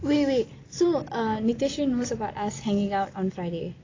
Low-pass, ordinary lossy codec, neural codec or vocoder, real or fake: 7.2 kHz; AAC, 32 kbps; none; real